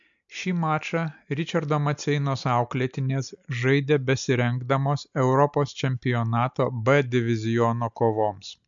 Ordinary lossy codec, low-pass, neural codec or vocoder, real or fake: MP3, 64 kbps; 7.2 kHz; none; real